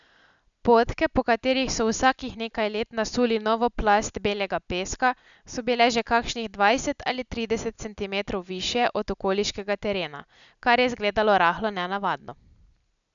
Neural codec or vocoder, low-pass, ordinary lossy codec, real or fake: none; 7.2 kHz; none; real